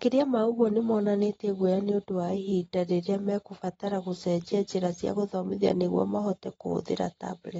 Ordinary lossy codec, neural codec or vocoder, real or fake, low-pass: AAC, 24 kbps; none; real; 19.8 kHz